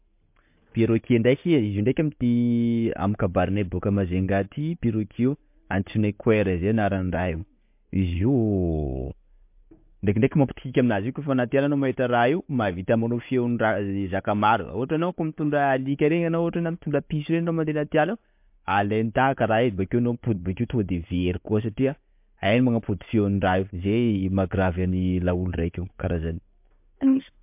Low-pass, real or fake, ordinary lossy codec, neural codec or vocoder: 3.6 kHz; real; MP3, 32 kbps; none